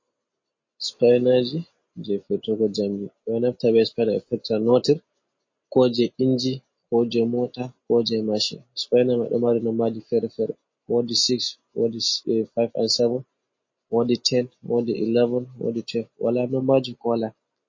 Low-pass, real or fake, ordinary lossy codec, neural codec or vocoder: 7.2 kHz; real; MP3, 32 kbps; none